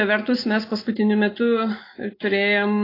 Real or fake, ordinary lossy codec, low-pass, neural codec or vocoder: real; AAC, 24 kbps; 5.4 kHz; none